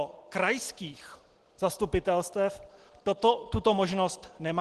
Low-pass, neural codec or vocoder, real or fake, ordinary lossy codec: 10.8 kHz; none; real; Opus, 16 kbps